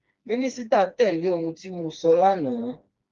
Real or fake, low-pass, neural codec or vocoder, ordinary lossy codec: fake; 7.2 kHz; codec, 16 kHz, 2 kbps, FreqCodec, smaller model; Opus, 32 kbps